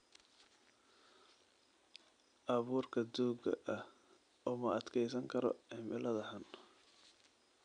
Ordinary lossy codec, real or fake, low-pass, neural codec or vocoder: none; real; 9.9 kHz; none